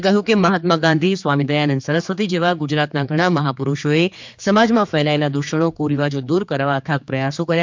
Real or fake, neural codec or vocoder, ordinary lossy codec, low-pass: fake; codec, 16 kHz, 4 kbps, X-Codec, HuBERT features, trained on general audio; MP3, 64 kbps; 7.2 kHz